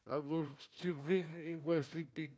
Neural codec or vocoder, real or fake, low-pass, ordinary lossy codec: codec, 16 kHz, 1 kbps, FunCodec, trained on Chinese and English, 50 frames a second; fake; none; none